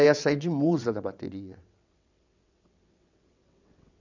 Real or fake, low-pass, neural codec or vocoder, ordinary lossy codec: fake; 7.2 kHz; vocoder, 22.05 kHz, 80 mel bands, WaveNeXt; none